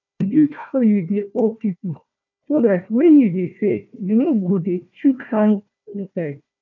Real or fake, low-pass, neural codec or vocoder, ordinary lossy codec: fake; 7.2 kHz; codec, 16 kHz, 1 kbps, FunCodec, trained on Chinese and English, 50 frames a second; none